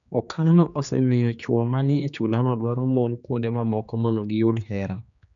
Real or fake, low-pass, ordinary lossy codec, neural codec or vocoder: fake; 7.2 kHz; none; codec, 16 kHz, 2 kbps, X-Codec, HuBERT features, trained on general audio